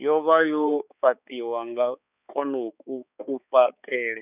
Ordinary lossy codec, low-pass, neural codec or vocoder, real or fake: none; 3.6 kHz; codec, 16 kHz, 4 kbps, X-Codec, HuBERT features, trained on balanced general audio; fake